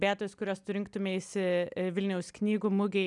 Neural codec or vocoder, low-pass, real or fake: none; 10.8 kHz; real